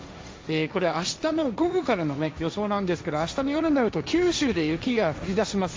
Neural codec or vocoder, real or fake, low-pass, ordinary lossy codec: codec, 16 kHz, 1.1 kbps, Voila-Tokenizer; fake; none; none